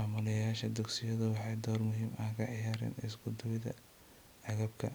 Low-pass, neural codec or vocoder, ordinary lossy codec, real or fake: none; vocoder, 44.1 kHz, 128 mel bands every 256 samples, BigVGAN v2; none; fake